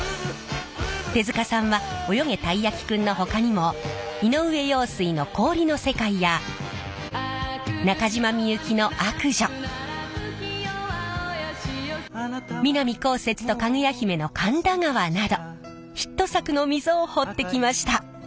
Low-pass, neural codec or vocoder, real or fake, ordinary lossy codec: none; none; real; none